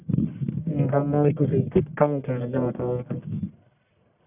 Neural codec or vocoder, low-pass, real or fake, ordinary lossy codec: codec, 44.1 kHz, 1.7 kbps, Pupu-Codec; 3.6 kHz; fake; none